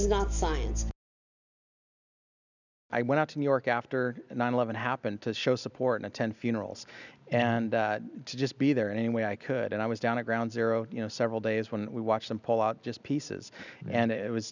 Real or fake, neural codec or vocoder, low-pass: real; none; 7.2 kHz